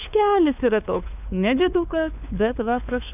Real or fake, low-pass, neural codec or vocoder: fake; 3.6 kHz; codec, 16 kHz, 4 kbps, FunCodec, trained on LibriTTS, 50 frames a second